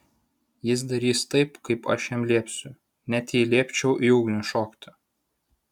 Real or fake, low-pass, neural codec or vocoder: real; 19.8 kHz; none